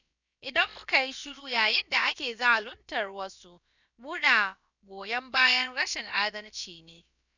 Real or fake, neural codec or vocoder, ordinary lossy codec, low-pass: fake; codec, 16 kHz, about 1 kbps, DyCAST, with the encoder's durations; none; 7.2 kHz